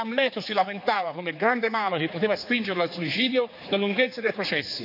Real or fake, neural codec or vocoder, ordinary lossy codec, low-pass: fake; codec, 16 kHz, 4 kbps, X-Codec, HuBERT features, trained on general audio; none; 5.4 kHz